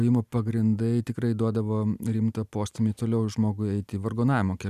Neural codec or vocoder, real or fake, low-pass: none; real; 14.4 kHz